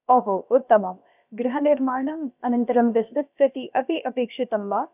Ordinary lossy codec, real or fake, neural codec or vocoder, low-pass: none; fake; codec, 16 kHz, about 1 kbps, DyCAST, with the encoder's durations; 3.6 kHz